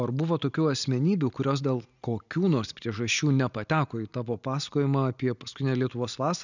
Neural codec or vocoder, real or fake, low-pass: none; real; 7.2 kHz